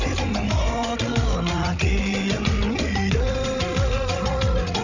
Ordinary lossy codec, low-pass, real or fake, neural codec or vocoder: none; 7.2 kHz; fake; codec, 16 kHz, 16 kbps, FreqCodec, larger model